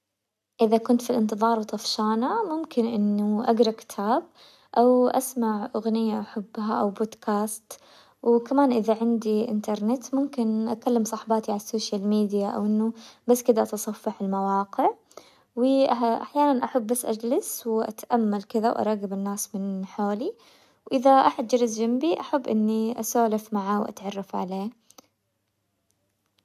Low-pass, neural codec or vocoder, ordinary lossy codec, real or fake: 14.4 kHz; none; none; real